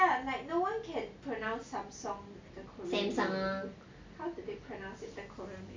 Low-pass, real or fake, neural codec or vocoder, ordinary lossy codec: 7.2 kHz; real; none; MP3, 64 kbps